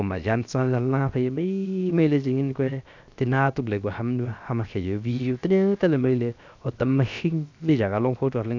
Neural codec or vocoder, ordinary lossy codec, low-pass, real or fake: codec, 16 kHz, 0.7 kbps, FocalCodec; none; 7.2 kHz; fake